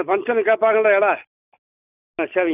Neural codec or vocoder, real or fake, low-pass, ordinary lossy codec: none; real; 3.6 kHz; none